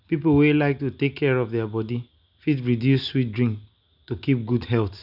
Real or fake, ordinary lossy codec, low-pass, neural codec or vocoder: real; none; 5.4 kHz; none